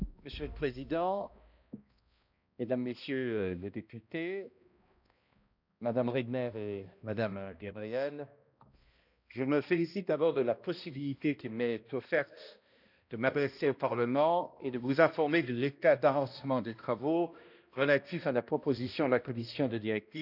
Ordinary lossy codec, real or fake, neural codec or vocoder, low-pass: none; fake; codec, 16 kHz, 1 kbps, X-Codec, HuBERT features, trained on balanced general audio; 5.4 kHz